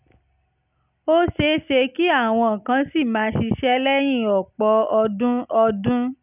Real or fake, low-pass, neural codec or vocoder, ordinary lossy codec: real; 3.6 kHz; none; none